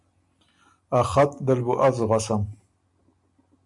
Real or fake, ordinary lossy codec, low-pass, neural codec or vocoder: real; MP3, 96 kbps; 10.8 kHz; none